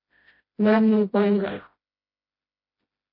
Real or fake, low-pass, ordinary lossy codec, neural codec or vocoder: fake; 5.4 kHz; MP3, 32 kbps; codec, 16 kHz, 0.5 kbps, FreqCodec, smaller model